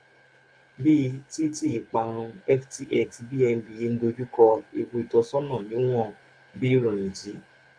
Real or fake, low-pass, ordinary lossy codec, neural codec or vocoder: fake; 9.9 kHz; AAC, 64 kbps; codec, 24 kHz, 6 kbps, HILCodec